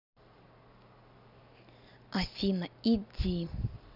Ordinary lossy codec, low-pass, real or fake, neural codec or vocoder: none; 5.4 kHz; real; none